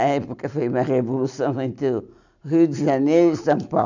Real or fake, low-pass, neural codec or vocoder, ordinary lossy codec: real; 7.2 kHz; none; none